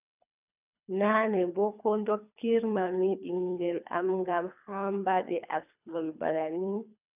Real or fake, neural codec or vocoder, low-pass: fake; codec, 24 kHz, 3 kbps, HILCodec; 3.6 kHz